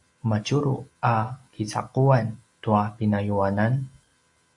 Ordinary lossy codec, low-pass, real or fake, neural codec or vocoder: MP3, 48 kbps; 10.8 kHz; real; none